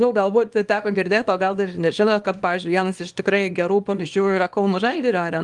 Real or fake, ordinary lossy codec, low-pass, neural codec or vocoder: fake; Opus, 24 kbps; 10.8 kHz; codec, 24 kHz, 0.9 kbps, WavTokenizer, small release